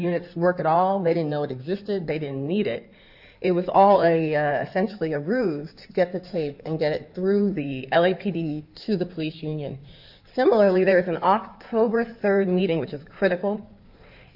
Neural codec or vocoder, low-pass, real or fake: codec, 16 kHz in and 24 kHz out, 2.2 kbps, FireRedTTS-2 codec; 5.4 kHz; fake